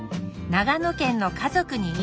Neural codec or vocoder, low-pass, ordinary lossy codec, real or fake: none; none; none; real